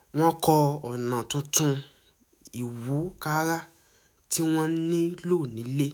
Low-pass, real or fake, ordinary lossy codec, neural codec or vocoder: none; fake; none; autoencoder, 48 kHz, 128 numbers a frame, DAC-VAE, trained on Japanese speech